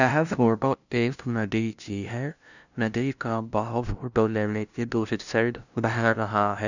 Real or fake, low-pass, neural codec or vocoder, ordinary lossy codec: fake; 7.2 kHz; codec, 16 kHz, 0.5 kbps, FunCodec, trained on LibriTTS, 25 frames a second; none